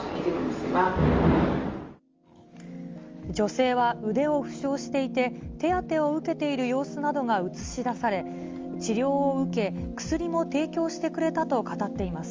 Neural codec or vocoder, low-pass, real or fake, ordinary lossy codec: none; 7.2 kHz; real; Opus, 32 kbps